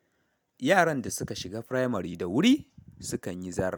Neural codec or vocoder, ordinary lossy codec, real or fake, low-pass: none; none; real; none